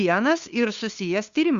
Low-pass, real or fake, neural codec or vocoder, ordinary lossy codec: 7.2 kHz; fake; codec, 16 kHz, 2 kbps, FunCodec, trained on LibriTTS, 25 frames a second; Opus, 64 kbps